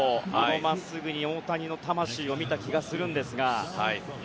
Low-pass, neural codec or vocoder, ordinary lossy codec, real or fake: none; none; none; real